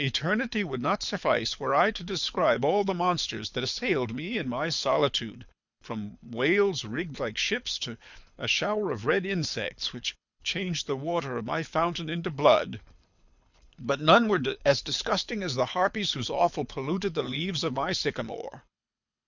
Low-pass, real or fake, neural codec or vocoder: 7.2 kHz; fake; codec, 24 kHz, 6 kbps, HILCodec